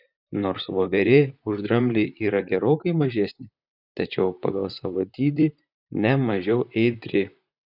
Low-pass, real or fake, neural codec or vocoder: 5.4 kHz; fake; vocoder, 44.1 kHz, 128 mel bands, Pupu-Vocoder